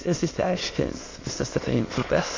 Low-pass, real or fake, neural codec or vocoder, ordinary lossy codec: 7.2 kHz; fake; autoencoder, 22.05 kHz, a latent of 192 numbers a frame, VITS, trained on many speakers; AAC, 32 kbps